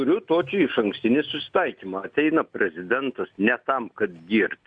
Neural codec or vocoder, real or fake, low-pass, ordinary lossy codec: none; real; 9.9 kHz; Opus, 64 kbps